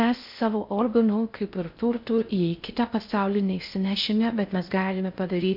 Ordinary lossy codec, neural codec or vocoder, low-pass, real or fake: MP3, 48 kbps; codec, 16 kHz in and 24 kHz out, 0.6 kbps, FocalCodec, streaming, 4096 codes; 5.4 kHz; fake